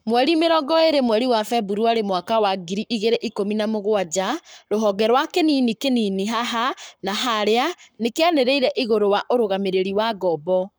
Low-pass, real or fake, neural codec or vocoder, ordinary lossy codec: none; fake; codec, 44.1 kHz, 7.8 kbps, Pupu-Codec; none